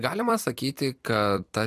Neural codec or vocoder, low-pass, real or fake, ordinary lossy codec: vocoder, 44.1 kHz, 128 mel bands every 256 samples, BigVGAN v2; 14.4 kHz; fake; AAC, 96 kbps